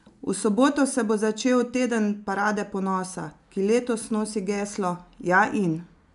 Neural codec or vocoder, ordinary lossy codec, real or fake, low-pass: none; MP3, 96 kbps; real; 10.8 kHz